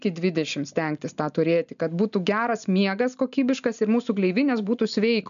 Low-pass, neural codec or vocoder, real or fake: 7.2 kHz; none; real